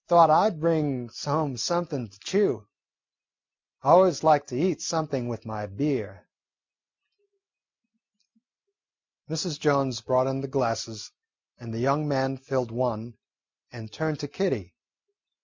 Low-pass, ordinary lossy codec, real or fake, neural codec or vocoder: 7.2 kHz; MP3, 64 kbps; real; none